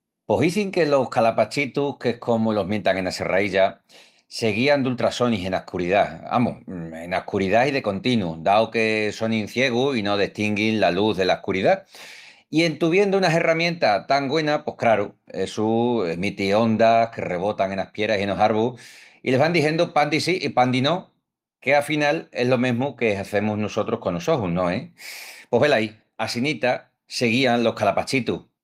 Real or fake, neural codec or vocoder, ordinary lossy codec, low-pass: real; none; Opus, 32 kbps; 14.4 kHz